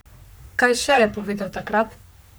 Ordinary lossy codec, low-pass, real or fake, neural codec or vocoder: none; none; fake; codec, 44.1 kHz, 3.4 kbps, Pupu-Codec